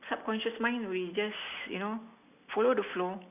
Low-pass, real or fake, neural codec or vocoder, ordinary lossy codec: 3.6 kHz; real; none; none